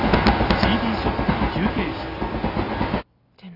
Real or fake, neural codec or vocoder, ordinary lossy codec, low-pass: real; none; none; 5.4 kHz